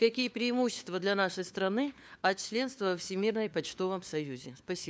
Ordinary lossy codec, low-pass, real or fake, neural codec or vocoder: none; none; fake; codec, 16 kHz, 4 kbps, FunCodec, trained on LibriTTS, 50 frames a second